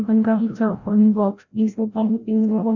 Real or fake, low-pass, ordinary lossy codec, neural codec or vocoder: fake; 7.2 kHz; none; codec, 16 kHz, 0.5 kbps, FreqCodec, larger model